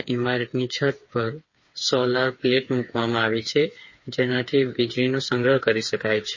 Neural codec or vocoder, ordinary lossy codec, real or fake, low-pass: codec, 16 kHz, 4 kbps, FreqCodec, smaller model; MP3, 32 kbps; fake; 7.2 kHz